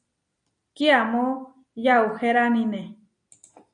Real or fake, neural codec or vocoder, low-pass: real; none; 9.9 kHz